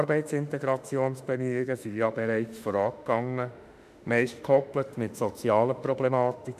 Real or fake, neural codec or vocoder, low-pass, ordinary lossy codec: fake; autoencoder, 48 kHz, 32 numbers a frame, DAC-VAE, trained on Japanese speech; 14.4 kHz; none